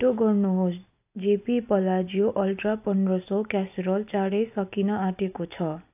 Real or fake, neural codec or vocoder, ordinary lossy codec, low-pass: real; none; AAC, 32 kbps; 3.6 kHz